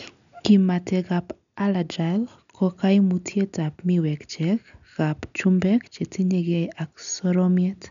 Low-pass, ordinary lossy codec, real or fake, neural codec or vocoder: 7.2 kHz; none; real; none